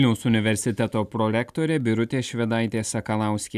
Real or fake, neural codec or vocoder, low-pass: real; none; 14.4 kHz